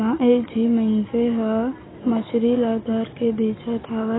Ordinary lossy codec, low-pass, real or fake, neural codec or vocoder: AAC, 16 kbps; 7.2 kHz; real; none